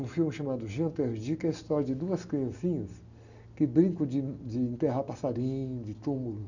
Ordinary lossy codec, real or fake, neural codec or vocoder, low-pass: none; real; none; 7.2 kHz